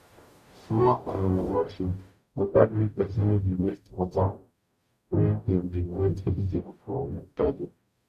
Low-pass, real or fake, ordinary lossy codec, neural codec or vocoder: 14.4 kHz; fake; none; codec, 44.1 kHz, 0.9 kbps, DAC